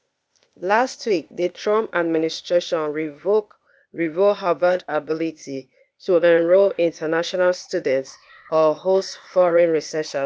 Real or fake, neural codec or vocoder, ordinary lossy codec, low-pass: fake; codec, 16 kHz, 0.8 kbps, ZipCodec; none; none